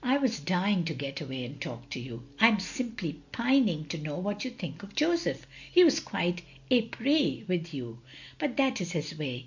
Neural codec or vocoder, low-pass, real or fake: none; 7.2 kHz; real